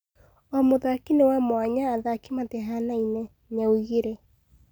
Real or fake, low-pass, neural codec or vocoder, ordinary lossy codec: real; none; none; none